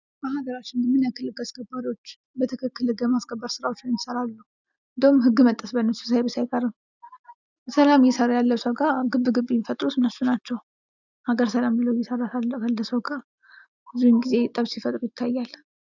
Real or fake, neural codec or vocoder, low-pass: real; none; 7.2 kHz